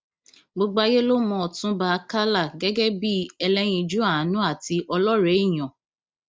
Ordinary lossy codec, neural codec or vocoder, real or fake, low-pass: none; none; real; none